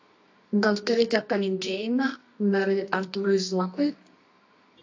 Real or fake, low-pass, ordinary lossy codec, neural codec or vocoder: fake; 7.2 kHz; AAC, 48 kbps; codec, 24 kHz, 0.9 kbps, WavTokenizer, medium music audio release